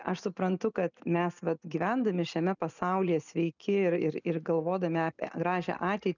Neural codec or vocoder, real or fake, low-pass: none; real; 7.2 kHz